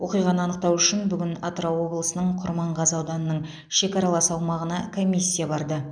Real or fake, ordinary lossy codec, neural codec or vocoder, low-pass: real; none; none; 9.9 kHz